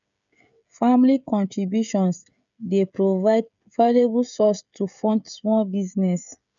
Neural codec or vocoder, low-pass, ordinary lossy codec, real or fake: codec, 16 kHz, 16 kbps, FreqCodec, smaller model; 7.2 kHz; none; fake